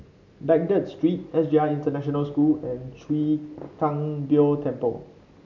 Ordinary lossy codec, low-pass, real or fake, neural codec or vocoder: MP3, 64 kbps; 7.2 kHz; real; none